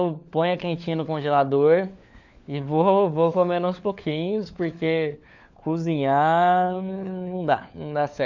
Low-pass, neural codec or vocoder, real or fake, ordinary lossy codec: 7.2 kHz; codec, 16 kHz, 4 kbps, FunCodec, trained on LibriTTS, 50 frames a second; fake; none